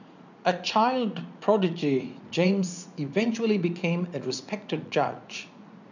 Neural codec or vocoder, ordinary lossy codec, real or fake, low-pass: vocoder, 44.1 kHz, 80 mel bands, Vocos; none; fake; 7.2 kHz